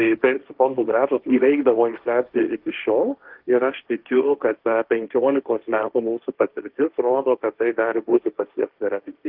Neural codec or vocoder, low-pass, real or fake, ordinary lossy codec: codec, 16 kHz, 1.1 kbps, Voila-Tokenizer; 5.4 kHz; fake; Opus, 32 kbps